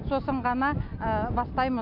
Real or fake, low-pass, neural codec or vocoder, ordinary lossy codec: real; 5.4 kHz; none; AAC, 48 kbps